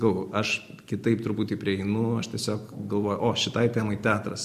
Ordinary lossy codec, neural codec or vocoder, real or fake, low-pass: MP3, 64 kbps; none; real; 14.4 kHz